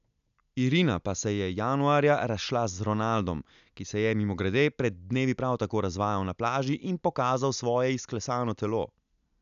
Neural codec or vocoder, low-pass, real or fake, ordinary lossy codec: none; 7.2 kHz; real; none